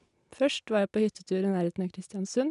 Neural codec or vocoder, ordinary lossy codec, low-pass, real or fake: none; none; 10.8 kHz; real